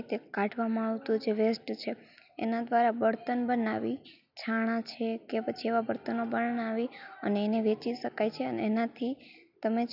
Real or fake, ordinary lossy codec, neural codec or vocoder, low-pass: real; none; none; 5.4 kHz